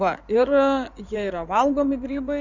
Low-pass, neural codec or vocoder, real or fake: 7.2 kHz; codec, 16 kHz in and 24 kHz out, 2.2 kbps, FireRedTTS-2 codec; fake